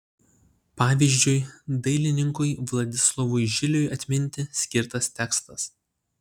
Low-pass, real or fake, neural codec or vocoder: 19.8 kHz; real; none